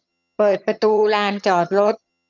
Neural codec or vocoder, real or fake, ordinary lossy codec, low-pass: vocoder, 22.05 kHz, 80 mel bands, HiFi-GAN; fake; none; 7.2 kHz